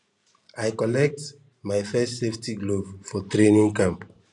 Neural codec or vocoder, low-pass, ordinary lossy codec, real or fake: vocoder, 44.1 kHz, 128 mel bands every 512 samples, BigVGAN v2; 10.8 kHz; none; fake